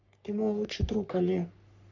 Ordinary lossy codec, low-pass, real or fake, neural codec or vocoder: MP3, 64 kbps; 7.2 kHz; fake; codec, 44.1 kHz, 3.4 kbps, Pupu-Codec